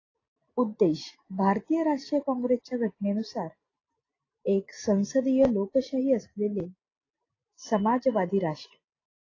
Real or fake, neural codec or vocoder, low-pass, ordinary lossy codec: real; none; 7.2 kHz; AAC, 32 kbps